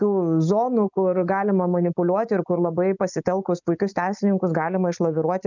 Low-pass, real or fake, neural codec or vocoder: 7.2 kHz; real; none